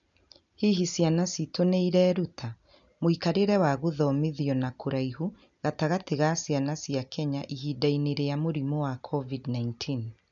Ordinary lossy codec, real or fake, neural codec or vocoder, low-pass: none; real; none; 7.2 kHz